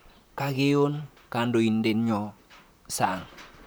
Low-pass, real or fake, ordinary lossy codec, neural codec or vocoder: none; fake; none; vocoder, 44.1 kHz, 128 mel bands, Pupu-Vocoder